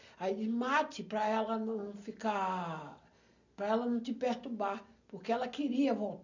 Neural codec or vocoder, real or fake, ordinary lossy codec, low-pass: none; real; none; 7.2 kHz